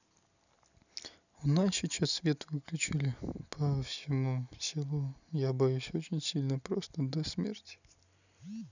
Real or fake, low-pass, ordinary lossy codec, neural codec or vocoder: real; 7.2 kHz; none; none